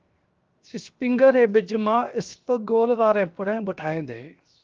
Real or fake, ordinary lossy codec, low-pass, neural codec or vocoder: fake; Opus, 24 kbps; 7.2 kHz; codec, 16 kHz, 0.7 kbps, FocalCodec